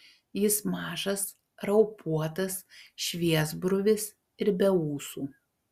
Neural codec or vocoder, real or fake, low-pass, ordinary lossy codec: none; real; 14.4 kHz; Opus, 64 kbps